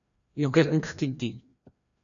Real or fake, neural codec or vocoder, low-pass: fake; codec, 16 kHz, 1 kbps, FreqCodec, larger model; 7.2 kHz